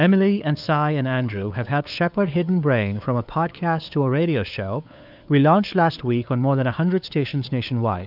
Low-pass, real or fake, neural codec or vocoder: 5.4 kHz; fake; codec, 16 kHz, 4 kbps, FunCodec, trained on LibriTTS, 50 frames a second